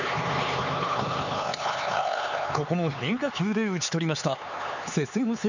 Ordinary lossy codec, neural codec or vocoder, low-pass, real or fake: none; codec, 16 kHz, 4 kbps, X-Codec, HuBERT features, trained on LibriSpeech; 7.2 kHz; fake